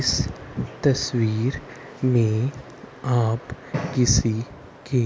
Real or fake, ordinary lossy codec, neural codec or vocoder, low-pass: real; none; none; none